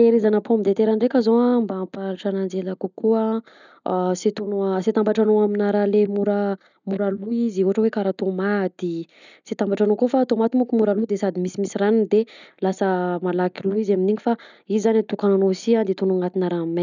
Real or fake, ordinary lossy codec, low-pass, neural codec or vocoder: real; none; 7.2 kHz; none